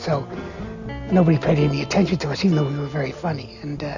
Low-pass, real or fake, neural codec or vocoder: 7.2 kHz; real; none